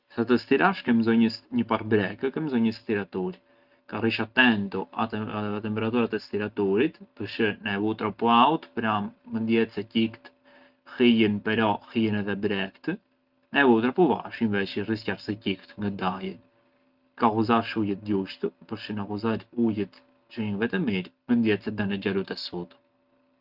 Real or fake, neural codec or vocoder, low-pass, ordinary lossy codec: real; none; 5.4 kHz; Opus, 32 kbps